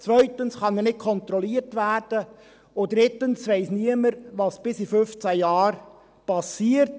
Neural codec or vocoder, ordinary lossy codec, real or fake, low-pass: none; none; real; none